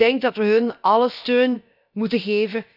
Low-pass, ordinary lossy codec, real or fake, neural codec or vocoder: 5.4 kHz; none; fake; codec, 16 kHz, about 1 kbps, DyCAST, with the encoder's durations